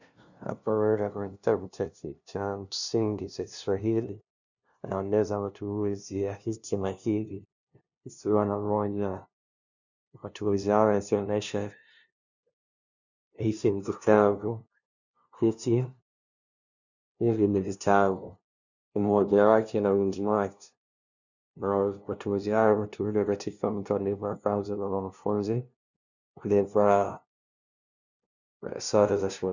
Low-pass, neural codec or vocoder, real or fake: 7.2 kHz; codec, 16 kHz, 0.5 kbps, FunCodec, trained on LibriTTS, 25 frames a second; fake